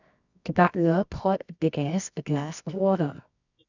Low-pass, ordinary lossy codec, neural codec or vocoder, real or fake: 7.2 kHz; none; codec, 24 kHz, 0.9 kbps, WavTokenizer, medium music audio release; fake